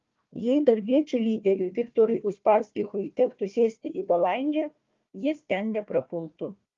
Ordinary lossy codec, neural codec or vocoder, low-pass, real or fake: Opus, 32 kbps; codec, 16 kHz, 1 kbps, FunCodec, trained on Chinese and English, 50 frames a second; 7.2 kHz; fake